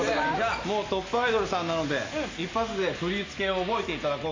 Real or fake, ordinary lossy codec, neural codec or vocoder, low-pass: real; AAC, 32 kbps; none; 7.2 kHz